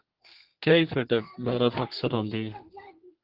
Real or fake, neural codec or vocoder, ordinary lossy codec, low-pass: fake; codec, 32 kHz, 1.9 kbps, SNAC; Opus, 16 kbps; 5.4 kHz